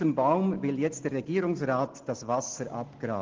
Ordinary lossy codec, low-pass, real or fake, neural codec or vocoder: Opus, 16 kbps; 7.2 kHz; real; none